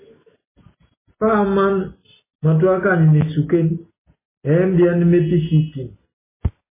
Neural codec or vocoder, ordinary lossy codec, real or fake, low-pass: none; MP3, 16 kbps; real; 3.6 kHz